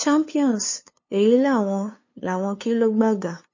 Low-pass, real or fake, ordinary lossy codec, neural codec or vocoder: 7.2 kHz; fake; MP3, 32 kbps; codec, 16 kHz, 2 kbps, FunCodec, trained on LibriTTS, 25 frames a second